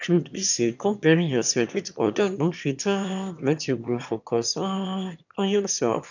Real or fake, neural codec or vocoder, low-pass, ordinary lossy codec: fake; autoencoder, 22.05 kHz, a latent of 192 numbers a frame, VITS, trained on one speaker; 7.2 kHz; none